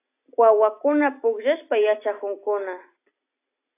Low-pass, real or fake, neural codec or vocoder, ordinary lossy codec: 3.6 kHz; real; none; AAC, 24 kbps